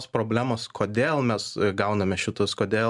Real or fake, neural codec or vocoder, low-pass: real; none; 10.8 kHz